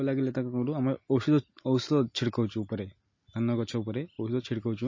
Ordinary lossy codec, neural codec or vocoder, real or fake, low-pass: MP3, 32 kbps; none; real; 7.2 kHz